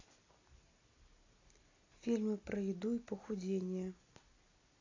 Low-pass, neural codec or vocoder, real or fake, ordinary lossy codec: 7.2 kHz; none; real; AAC, 32 kbps